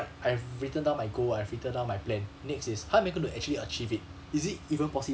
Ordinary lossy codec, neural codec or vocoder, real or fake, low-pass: none; none; real; none